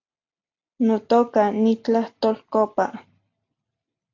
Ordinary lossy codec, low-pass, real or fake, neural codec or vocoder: AAC, 48 kbps; 7.2 kHz; real; none